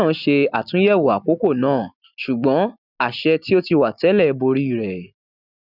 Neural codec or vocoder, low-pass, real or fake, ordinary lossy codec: none; 5.4 kHz; real; none